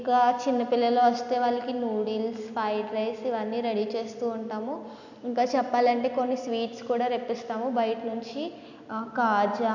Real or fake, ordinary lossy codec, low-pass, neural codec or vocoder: real; none; 7.2 kHz; none